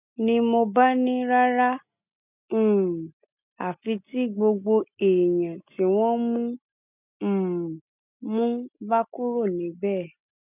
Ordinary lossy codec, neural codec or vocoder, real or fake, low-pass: none; none; real; 3.6 kHz